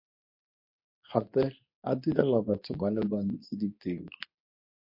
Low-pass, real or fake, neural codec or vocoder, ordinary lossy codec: 5.4 kHz; fake; codec, 24 kHz, 0.9 kbps, WavTokenizer, medium speech release version 2; MP3, 32 kbps